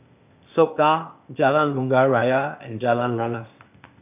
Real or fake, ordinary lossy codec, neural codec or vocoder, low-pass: fake; none; codec, 16 kHz, 0.8 kbps, ZipCodec; 3.6 kHz